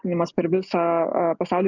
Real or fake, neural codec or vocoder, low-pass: real; none; 7.2 kHz